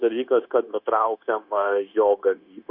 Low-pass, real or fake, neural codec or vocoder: 5.4 kHz; fake; codec, 16 kHz, 0.9 kbps, LongCat-Audio-Codec